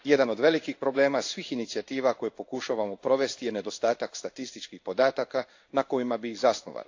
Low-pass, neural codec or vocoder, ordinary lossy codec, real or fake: 7.2 kHz; codec, 16 kHz in and 24 kHz out, 1 kbps, XY-Tokenizer; AAC, 48 kbps; fake